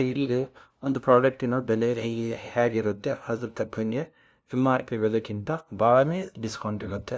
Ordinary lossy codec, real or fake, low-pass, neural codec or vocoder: none; fake; none; codec, 16 kHz, 0.5 kbps, FunCodec, trained on LibriTTS, 25 frames a second